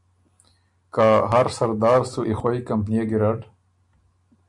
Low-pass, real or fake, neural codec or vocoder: 10.8 kHz; real; none